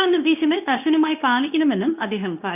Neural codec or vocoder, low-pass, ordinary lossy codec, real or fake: codec, 24 kHz, 0.9 kbps, WavTokenizer, medium speech release version 2; 3.6 kHz; none; fake